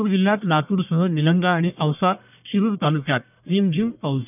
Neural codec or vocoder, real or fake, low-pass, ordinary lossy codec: codec, 44.1 kHz, 1.7 kbps, Pupu-Codec; fake; 3.6 kHz; none